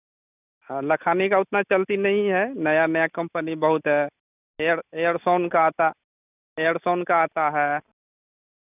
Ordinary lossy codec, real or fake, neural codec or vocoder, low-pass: none; real; none; 3.6 kHz